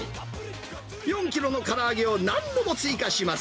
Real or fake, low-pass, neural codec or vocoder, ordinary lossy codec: real; none; none; none